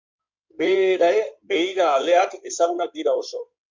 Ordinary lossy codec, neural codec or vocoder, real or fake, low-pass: MP3, 64 kbps; codec, 16 kHz in and 24 kHz out, 2.2 kbps, FireRedTTS-2 codec; fake; 7.2 kHz